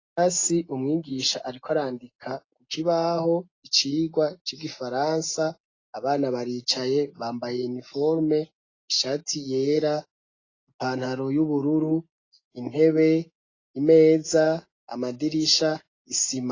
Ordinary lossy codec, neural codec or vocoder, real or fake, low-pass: AAC, 32 kbps; none; real; 7.2 kHz